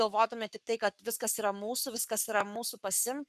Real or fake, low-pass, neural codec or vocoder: fake; 14.4 kHz; codec, 44.1 kHz, 7.8 kbps, Pupu-Codec